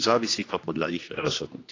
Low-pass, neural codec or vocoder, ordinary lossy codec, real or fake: 7.2 kHz; codec, 16 kHz, 2 kbps, X-Codec, HuBERT features, trained on general audio; AAC, 32 kbps; fake